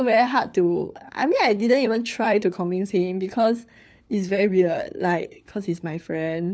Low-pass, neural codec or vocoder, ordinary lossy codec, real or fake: none; codec, 16 kHz, 4 kbps, FunCodec, trained on LibriTTS, 50 frames a second; none; fake